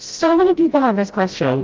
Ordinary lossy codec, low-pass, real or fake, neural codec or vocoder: Opus, 32 kbps; 7.2 kHz; fake; codec, 16 kHz, 0.5 kbps, FreqCodec, smaller model